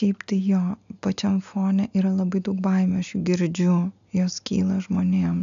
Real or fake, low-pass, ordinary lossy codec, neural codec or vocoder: real; 7.2 kHz; AAC, 96 kbps; none